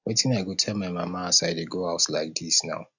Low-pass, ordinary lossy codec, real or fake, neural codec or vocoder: 7.2 kHz; none; real; none